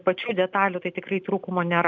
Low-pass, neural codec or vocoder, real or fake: 7.2 kHz; none; real